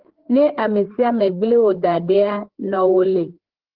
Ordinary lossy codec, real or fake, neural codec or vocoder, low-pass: Opus, 16 kbps; fake; codec, 16 kHz, 4 kbps, FreqCodec, larger model; 5.4 kHz